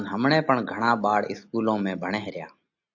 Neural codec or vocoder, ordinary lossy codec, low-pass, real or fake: none; AAC, 48 kbps; 7.2 kHz; real